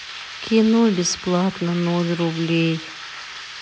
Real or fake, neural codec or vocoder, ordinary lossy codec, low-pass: real; none; none; none